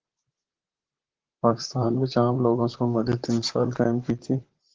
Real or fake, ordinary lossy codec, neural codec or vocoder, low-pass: fake; Opus, 16 kbps; vocoder, 44.1 kHz, 128 mel bands, Pupu-Vocoder; 7.2 kHz